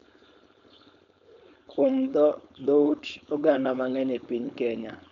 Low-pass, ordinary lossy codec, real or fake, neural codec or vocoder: 7.2 kHz; none; fake; codec, 16 kHz, 4.8 kbps, FACodec